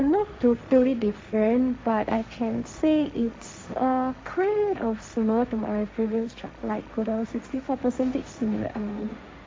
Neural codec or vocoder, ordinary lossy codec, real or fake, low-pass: codec, 16 kHz, 1.1 kbps, Voila-Tokenizer; none; fake; none